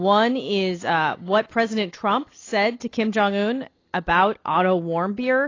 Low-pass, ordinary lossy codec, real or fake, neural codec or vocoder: 7.2 kHz; AAC, 32 kbps; real; none